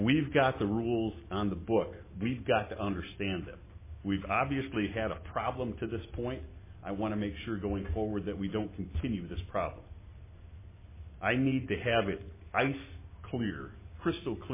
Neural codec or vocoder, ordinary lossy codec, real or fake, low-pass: none; MP3, 16 kbps; real; 3.6 kHz